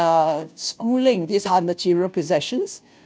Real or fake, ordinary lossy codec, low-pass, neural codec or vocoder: fake; none; none; codec, 16 kHz, 0.5 kbps, FunCodec, trained on Chinese and English, 25 frames a second